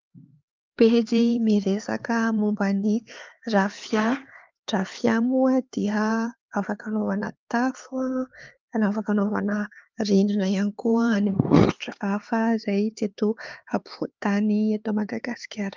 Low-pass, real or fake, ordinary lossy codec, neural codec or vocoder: 7.2 kHz; fake; Opus, 32 kbps; codec, 16 kHz, 4 kbps, X-Codec, HuBERT features, trained on LibriSpeech